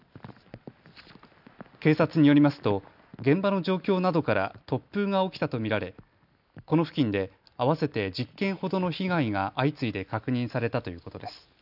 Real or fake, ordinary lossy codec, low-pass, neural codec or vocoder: real; none; 5.4 kHz; none